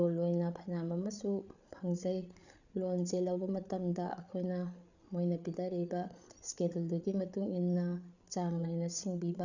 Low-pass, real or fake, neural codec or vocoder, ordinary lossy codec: 7.2 kHz; fake; codec, 16 kHz, 8 kbps, FreqCodec, larger model; none